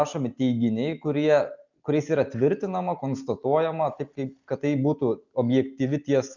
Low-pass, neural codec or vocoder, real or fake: 7.2 kHz; none; real